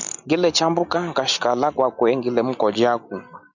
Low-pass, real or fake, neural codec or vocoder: 7.2 kHz; real; none